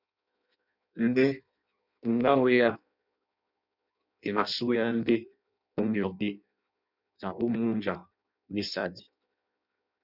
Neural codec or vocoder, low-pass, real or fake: codec, 16 kHz in and 24 kHz out, 0.6 kbps, FireRedTTS-2 codec; 5.4 kHz; fake